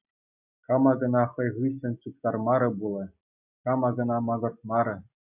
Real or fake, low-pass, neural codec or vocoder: real; 3.6 kHz; none